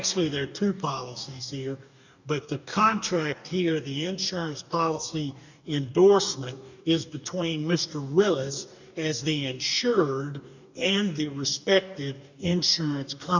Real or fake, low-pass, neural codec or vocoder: fake; 7.2 kHz; codec, 44.1 kHz, 2.6 kbps, DAC